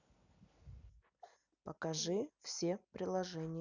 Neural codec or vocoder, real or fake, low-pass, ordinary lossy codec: none; real; 7.2 kHz; none